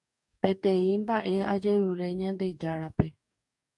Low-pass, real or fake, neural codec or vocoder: 10.8 kHz; fake; codec, 44.1 kHz, 2.6 kbps, DAC